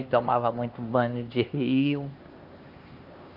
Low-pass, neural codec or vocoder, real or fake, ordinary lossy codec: 5.4 kHz; codec, 16 kHz, 2 kbps, X-Codec, HuBERT features, trained on LibriSpeech; fake; Opus, 32 kbps